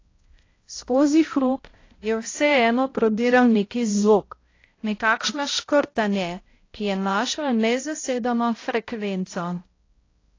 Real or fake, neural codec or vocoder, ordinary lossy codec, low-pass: fake; codec, 16 kHz, 0.5 kbps, X-Codec, HuBERT features, trained on balanced general audio; AAC, 32 kbps; 7.2 kHz